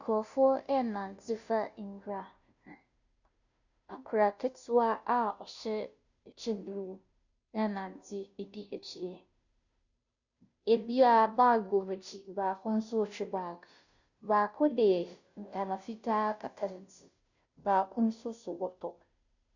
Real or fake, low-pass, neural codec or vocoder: fake; 7.2 kHz; codec, 16 kHz, 0.5 kbps, FunCodec, trained on Chinese and English, 25 frames a second